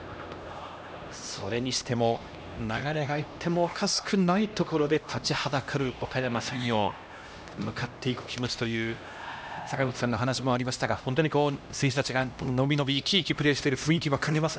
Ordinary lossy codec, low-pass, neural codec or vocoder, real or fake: none; none; codec, 16 kHz, 1 kbps, X-Codec, HuBERT features, trained on LibriSpeech; fake